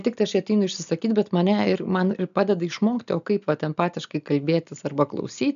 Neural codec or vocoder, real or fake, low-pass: none; real; 7.2 kHz